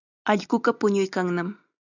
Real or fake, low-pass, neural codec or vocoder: real; 7.2 kHz; none